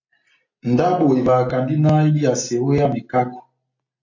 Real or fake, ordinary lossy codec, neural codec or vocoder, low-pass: real; AAC, 48 kbps; none; 7.2 kHz